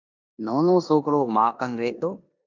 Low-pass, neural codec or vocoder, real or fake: 7.2 kHz; codec, 16 kHz in and 24 kHz out, 0.9 kbps, LongCat-Audio-Codec, four codebook decoder; fake